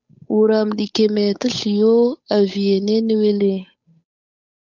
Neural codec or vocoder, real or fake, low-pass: codec, 16 kHz, 8 kbps, FunCodec, trained on Chinese and English, 25 frames a second; fake; 7.2 kHz